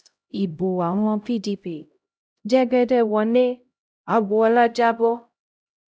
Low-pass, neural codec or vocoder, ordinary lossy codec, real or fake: none; codec, 16 kHz, 0.5 kbps, X-Codec, HuBERT features, trained on LibriSpeech; none; fake